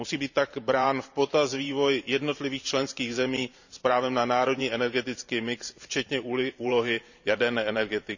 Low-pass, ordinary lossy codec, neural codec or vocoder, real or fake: 7.2 kHz; none; vocoder, 44.1 kHz, 128 mel bands every 512 samples, BigVGAN v2; fake